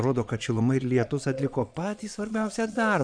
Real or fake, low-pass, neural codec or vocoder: fake; 9.9 kHz; vocoder, 22.05 kHz, 80 mel bands, Vocos